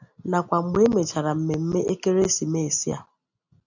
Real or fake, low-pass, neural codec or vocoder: real; 7.2 kHz; none